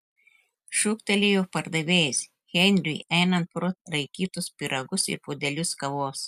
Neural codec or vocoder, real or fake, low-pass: none; real; 14.4 kHz